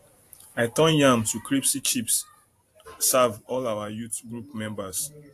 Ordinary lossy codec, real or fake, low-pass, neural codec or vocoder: AAC, 64 kbps; fake; 14.4 kHz; vocoder, 44.1 kHz, 128 mel bands every 512 samples, BigVGAN v2